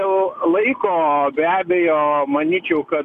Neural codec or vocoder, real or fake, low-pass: none; real; 14.4 kHz